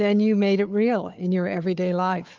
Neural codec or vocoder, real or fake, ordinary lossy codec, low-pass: codec, 16 kHz, 4 kbps, FunCodec, trained on Chinese and English, 50 frames a second; fake; Opus, 32 kbps; 7.2 kHz